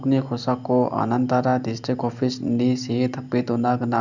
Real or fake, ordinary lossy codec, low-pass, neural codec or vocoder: real; none; 7.2 kHz; none